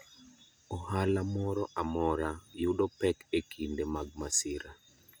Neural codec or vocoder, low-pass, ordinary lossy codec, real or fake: none; none; none; real